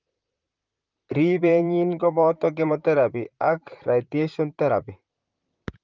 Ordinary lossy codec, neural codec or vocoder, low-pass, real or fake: Opus, 24 kbps; vocoder, 44.1 kHz, 128 mel bands, Pupu-Vocoder; 7.2 kHz; fake